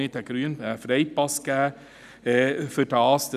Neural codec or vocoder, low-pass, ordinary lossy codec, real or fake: none; 14.4 kHz; none; real